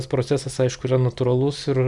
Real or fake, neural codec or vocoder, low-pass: real; none; 10.8 kHz